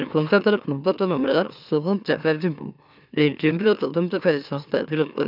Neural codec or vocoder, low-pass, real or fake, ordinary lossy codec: autoencoder, 44.1 kHz, a latent of 192 numbers a frame, MeloTTS; 5.4 kHz; fake; none